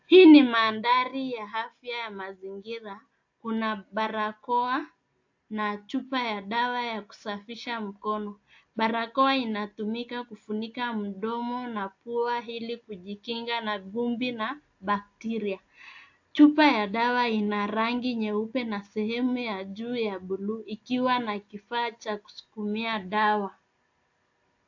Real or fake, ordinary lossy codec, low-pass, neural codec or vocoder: real; AAC, 48 kbps; 7.2 kHz; none